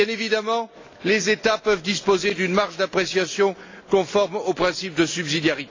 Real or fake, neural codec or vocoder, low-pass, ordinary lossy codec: real; none; 7.2 kHz; AAC, 32 kbps